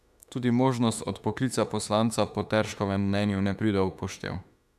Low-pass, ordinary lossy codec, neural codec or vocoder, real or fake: 14.4 kHz; none; autoencoder, 48 kHz, 32 numbers a frame, DAC-VAE, trained on Japanese speech; fake